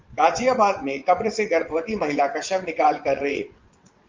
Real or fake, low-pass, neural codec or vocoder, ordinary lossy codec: fake; 7.2 kHz; vocoder, 22.05 kHz, 80 mel bands, WaveNeXt; Opus, 32 kbps